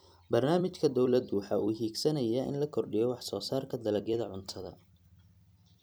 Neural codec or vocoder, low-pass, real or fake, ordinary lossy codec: vocoder, 44.1 kHz, 128 mel bands every 256 samples, BigVGAN v2; none; fake; none